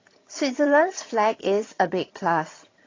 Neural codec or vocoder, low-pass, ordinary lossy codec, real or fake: vocoder, 22.05 kHz, 80 mel bands, HiFi-GAN; 7.2 kHz; AAC, 32 kbps; fake